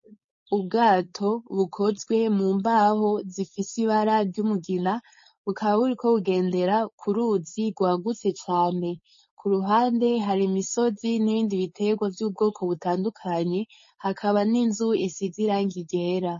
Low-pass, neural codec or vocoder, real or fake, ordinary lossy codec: 7.2 kHz; codec, 16 kHz, 4.8 kbps, FACodec; fake; MP3, 32 kbps